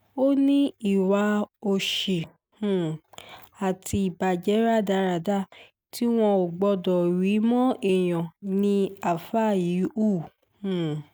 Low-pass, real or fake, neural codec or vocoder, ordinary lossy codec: none; real; none; none